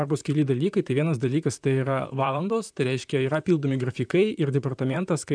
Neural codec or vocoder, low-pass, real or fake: vocoder, 44.1 kHz, 128 mel bands, Pupu-Vocoder; 9.9 kHz; fake